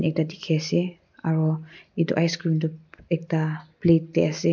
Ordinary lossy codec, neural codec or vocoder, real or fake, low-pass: MP3, 64 kbps; none; real; 7.2 kHz